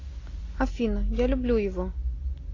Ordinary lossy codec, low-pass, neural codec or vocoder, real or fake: AAC, 32 kbps; 7.2 kHz; none; real